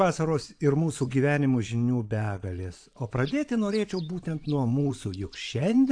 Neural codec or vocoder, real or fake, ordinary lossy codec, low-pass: vocoder, 22.05 kHz, 80 mel bands, Vocos; fake; AAC, 64 kbps; 9.9 kHz